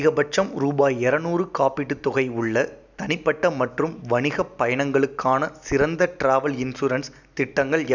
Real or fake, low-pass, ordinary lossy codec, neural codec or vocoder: real; 7.2 kHz; none; none